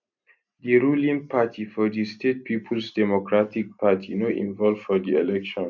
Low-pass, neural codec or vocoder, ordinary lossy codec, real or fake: 7.2 kHz; none; none; real